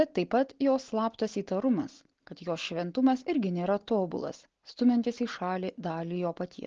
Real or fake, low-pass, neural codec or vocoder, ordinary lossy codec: real; 7.2 kHz; none; Opus, 16 kbps